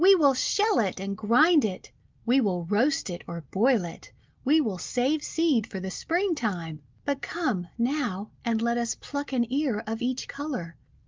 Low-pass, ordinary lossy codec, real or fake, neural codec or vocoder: 7.2 kHz; Opus, 24 kbps; real; none